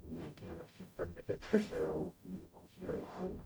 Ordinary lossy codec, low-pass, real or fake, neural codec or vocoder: none; none; fake; codec, 44.1 kHz, 0.9 kbps, DAC